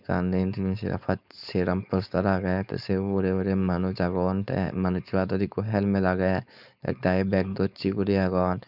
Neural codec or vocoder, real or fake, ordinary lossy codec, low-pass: codec, 16 kHz, 4.8 kbps, FACodec; fake; none; 5.4 kHz